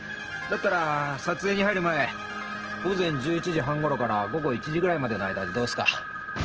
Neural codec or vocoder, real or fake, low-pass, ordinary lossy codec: none; real; 7.2 kHz; Opus, 16 kbps